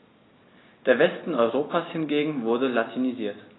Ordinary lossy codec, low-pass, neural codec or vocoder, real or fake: AAC, 16 kbps; 7.2 kHz; none; real